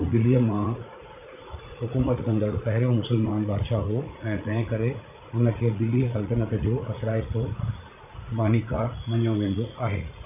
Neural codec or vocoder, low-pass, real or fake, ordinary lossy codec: vocoder, 44.1 kHz, 80 mel bands, Vocos; 3.6 kHz; fake; none